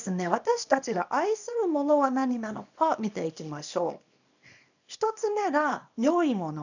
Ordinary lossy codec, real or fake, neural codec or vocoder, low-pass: none; fake; codec, 24 kHz, 0.9 kbps, WavTokenizer, small release; 7.2 kHz